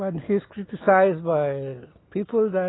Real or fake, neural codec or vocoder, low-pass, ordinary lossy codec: real; none; 7.2 kHz; AAC, 16 kbps